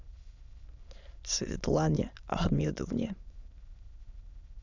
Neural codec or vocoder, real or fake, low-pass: autoencoder, 22.05 kHz, a latent of 192 numbers a frame, VITS, trained on many speakers; fake; 7.2 kHz